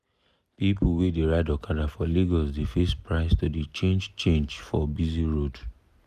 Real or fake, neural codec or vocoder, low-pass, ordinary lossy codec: real; none; 14.4 kHz; none